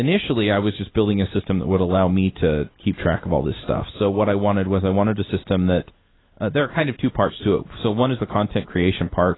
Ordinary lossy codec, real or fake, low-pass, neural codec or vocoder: AAC, 16 kbps; real; 7.2 kHz; none